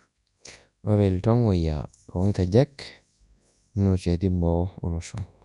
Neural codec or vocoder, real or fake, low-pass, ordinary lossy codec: codec, 24 kHz, 0.9 kbps, WavTokenizer, large speech release; fake; 10.8 kHz; none